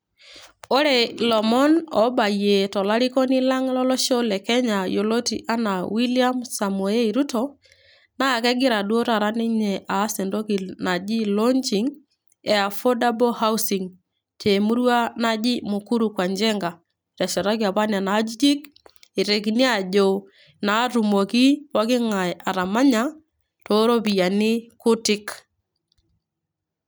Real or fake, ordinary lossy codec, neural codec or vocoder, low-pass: real; none; none; none